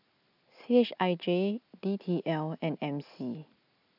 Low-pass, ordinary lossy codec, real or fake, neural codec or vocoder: 5.4 kHz; none; real; none